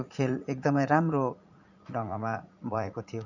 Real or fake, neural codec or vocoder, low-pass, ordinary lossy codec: fake; vocoder, 44.1 kHz, 80 mel bands, Vocos; 7.2 kHz; none